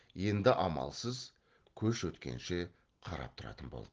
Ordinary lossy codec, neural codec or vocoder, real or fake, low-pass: Opus, 16 kbps; none; real; 7.2 kHz